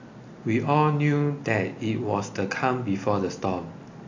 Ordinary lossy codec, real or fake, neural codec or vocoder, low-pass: AAC, 48 kbps; real; none; 7.2 kHz